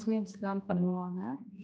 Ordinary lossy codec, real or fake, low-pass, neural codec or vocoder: none; fake; none; codec, 16 kHz, 1 kbps, X-Codec, HuBERT features, trained on general audio